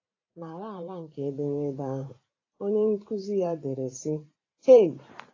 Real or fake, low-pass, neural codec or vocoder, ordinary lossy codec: fake; 7.2 kHz; vocoder, 44.1 kHz, 128 mel bands every 512 samples, BigVGAN v2; AAC, 32 kbps